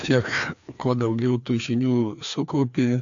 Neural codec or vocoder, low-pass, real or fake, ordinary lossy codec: codec, 16 kHz, 2 kbps, FreqCodec, larger model; 7.2 kHz; fake; AAC, 64 kbps